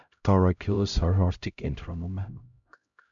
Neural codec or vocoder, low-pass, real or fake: codec, 16 kHz, 0.5 kbps, X-Codec, HuBERT features, trained on LibriSpeech; 7.2 kHz; fake